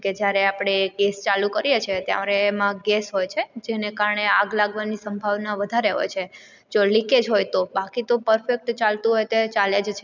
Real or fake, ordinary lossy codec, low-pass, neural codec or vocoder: real; none; 7.2 kHz; none